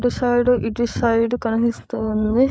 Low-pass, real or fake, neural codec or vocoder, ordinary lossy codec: none; fake; codec, 16 kHz, 8 kbps, FreqCodec, larger model; none